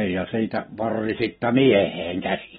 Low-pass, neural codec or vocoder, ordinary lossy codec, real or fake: 14.4 kHz; none; AAC, 16 kbps; real